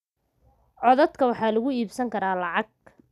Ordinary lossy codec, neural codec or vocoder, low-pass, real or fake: none; none; 14.4 kHz; real